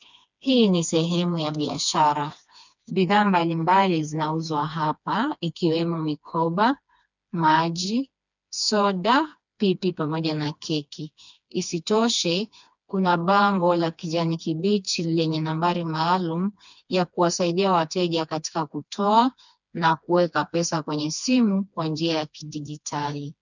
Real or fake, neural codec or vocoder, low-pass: fake; codec, 16 kHz, 2 kbps, FreqCodec, smaller model; 7.2 kHz